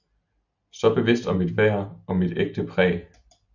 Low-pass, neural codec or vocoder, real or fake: 7.2 kHz; none; real